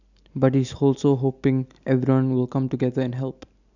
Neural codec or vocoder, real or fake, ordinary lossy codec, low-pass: none; real; none; 7.2 kHz